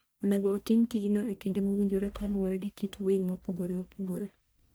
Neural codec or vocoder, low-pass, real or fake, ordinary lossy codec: codec, 44.1 kHz, 1.7 kbps, Pupu-Codec; none; fake; none